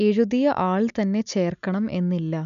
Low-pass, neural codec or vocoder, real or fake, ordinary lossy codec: 7.2 kHz; none; real; none